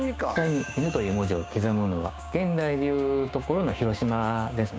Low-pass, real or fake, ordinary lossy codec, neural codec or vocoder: none; fake; none; codec, 16 kHz, 6 kbps, DAC